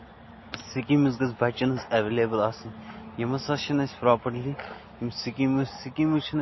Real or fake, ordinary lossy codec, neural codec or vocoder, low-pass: real; MP3, 24 kbps; none; 7.2 kHz